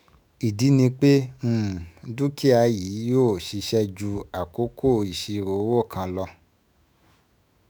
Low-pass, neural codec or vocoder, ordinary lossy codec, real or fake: none; autoencoder, 48 kHz, 128 numbers a frame, DAC-VAE, trained on Japanese speech; none; fake